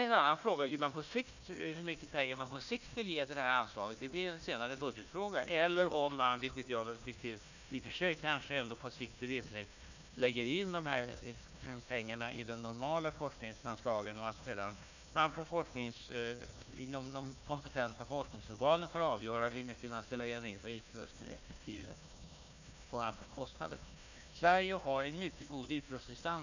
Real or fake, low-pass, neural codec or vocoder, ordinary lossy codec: fake; 7.2 kHz; codec, 16 kHz, 1 kbps, FunCodec, trained on Chinese and English, 50 frames a second; none